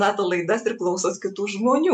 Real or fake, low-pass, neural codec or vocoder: real; 10.8 kHz; none